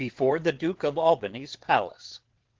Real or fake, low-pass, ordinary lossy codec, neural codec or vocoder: fake; 7.2 kHz; Opus, 16 kbps; vocoder, 22.05 kHz, 80 mel bands, WaveNeXt